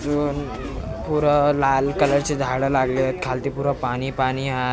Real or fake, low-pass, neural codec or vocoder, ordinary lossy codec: real; none; none; none